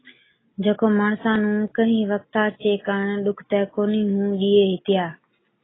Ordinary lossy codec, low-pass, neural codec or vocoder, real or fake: AAC, 16 kbps; 7.2 kHz; none; real